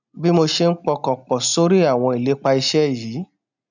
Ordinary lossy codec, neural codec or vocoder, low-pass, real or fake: none; none; 7.2 kHz; real